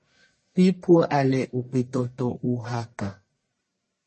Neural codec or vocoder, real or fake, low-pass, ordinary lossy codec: codec, 44.1 kHz, 1.7 kbps, Pupu-Codec; fake; 10.8 kHz; MP3, 32 kbps